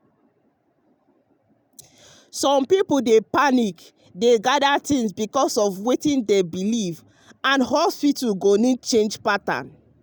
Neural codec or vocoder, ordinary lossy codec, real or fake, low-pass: none; none; real; none